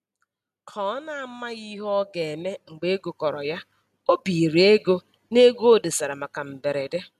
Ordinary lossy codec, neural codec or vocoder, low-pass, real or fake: none; none; 14.4 kHz; real